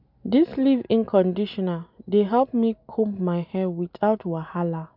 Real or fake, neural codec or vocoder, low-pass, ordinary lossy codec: real; none; 5.4 kHz; none